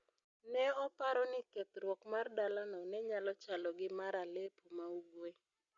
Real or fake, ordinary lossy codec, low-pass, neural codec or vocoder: real; Opus, 64 kbps; 7.2 kHz; none